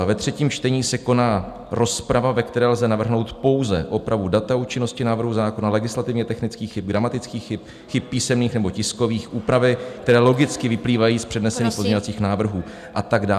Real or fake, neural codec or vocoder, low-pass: real; none; 14.4 kHz